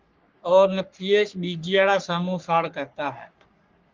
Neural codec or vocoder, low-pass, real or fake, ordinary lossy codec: codec, 44.1 kHz, 3.4 kbps, Pupu-Codec; 7.2 kHz; fake; Opus, 32 kbps